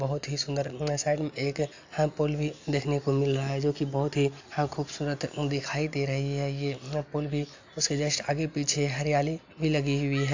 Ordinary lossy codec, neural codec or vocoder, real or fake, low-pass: AAC, 48 kbps; none; real; 7.2 kHz